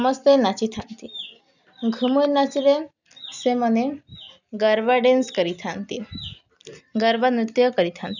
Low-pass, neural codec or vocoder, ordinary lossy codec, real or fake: 7.2 kHz; none; none; real